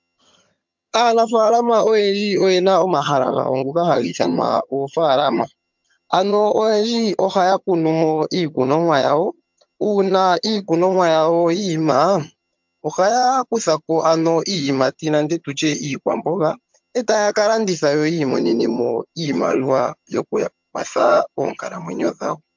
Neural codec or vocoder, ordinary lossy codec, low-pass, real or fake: vocoder, 22.05 kHz, 80 mel bands, HiFi-GAN; MP3, 64 kbps; 7.2 kHz; fake